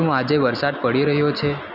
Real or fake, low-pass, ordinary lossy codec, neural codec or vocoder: real; 5.4 kHz; Opus, 64 kbps; none